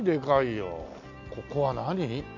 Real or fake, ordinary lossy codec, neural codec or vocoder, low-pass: real; none; none; 7.2 kHz